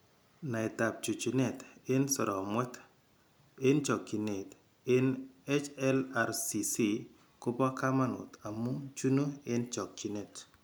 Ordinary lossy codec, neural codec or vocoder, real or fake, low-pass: none; none; real; none